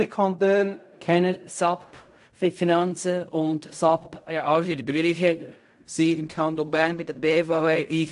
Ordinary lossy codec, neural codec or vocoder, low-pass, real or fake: AAC, 64 kbps; codec, 16 kHz in and 24 kHz out, 0.4 kbps, LongCat-Audio-Codec, fine tuned four codebook decoder; 10.8 kHz; fake